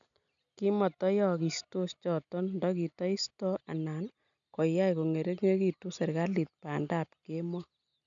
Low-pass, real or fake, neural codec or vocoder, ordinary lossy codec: 7.2 kHz; real; none; none